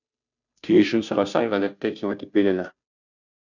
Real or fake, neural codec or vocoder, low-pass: fake; codec, 16 kHz, 0.5 kbps, FunCodec, trained on Chinese and English, 25 frames a second; 7.2 kHz